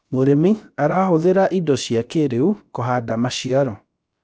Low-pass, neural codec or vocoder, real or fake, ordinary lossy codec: none; codec, 16 kHz, about 1 kbps, DyCAST, with the encoder's durations; fake; none